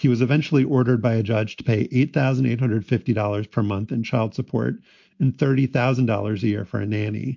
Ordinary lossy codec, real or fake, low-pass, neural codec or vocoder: MP3, 48 kbps; real; 7.2 kHz; none